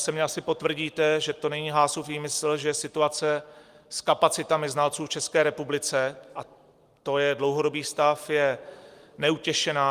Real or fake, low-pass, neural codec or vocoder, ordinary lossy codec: real; 14.4 kHz; none; Opus, 32 kbps